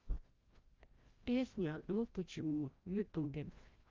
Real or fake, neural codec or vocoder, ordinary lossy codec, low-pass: fake; codec, 16 kHz, 0.5 kbps, FreqCodec, larger model; Opus, 24 kbps; 7.2 kHz